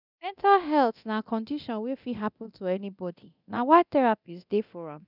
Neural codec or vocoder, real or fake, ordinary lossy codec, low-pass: codec, 24 kHz, 0.9 kbps, DualCodec; fake; none; 5.4 kHz